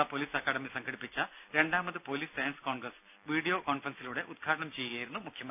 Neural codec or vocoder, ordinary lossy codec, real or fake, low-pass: none; none; real; 3.6 kHz